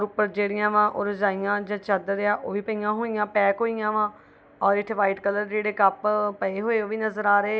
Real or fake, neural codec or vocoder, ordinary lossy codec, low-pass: real; none; none; none